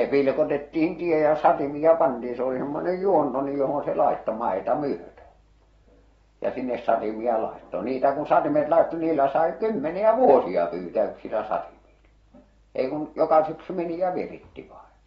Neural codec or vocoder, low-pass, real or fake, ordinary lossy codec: none; 19.8 kHz; real; AAC, 24 kbps